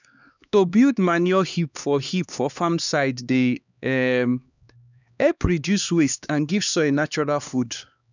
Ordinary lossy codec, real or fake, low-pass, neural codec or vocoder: none; fake; 7.2 kHz; codec, 16 kHz, 2 kbps, X-Codec, HuBERT features, trained on LibriSpeech